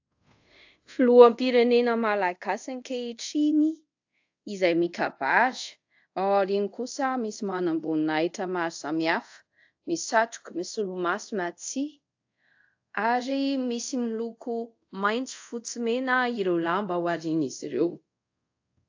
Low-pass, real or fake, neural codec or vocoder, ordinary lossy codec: 7.2 kHz; fake; codec, 24 kHz, 0.5 kbps, DualCodec; AAC, 48 kbps